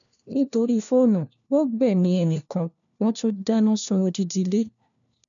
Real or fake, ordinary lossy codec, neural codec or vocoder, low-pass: fake; none; codec, 16 kHz, 1 kbps, FunCodec, trained on LibriTTS, 50 frames a second; 7.2 kHz